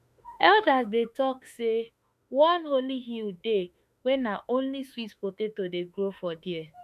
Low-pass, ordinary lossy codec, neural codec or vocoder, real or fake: 14.4 kHz; none; autoencoder, 48 kHz, 32 numbers a frame, DAC-VAE, trained on Japanese speech; fake